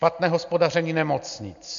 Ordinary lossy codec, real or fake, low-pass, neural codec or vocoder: MP3, 64 kbps; real; 7.2 kHz; none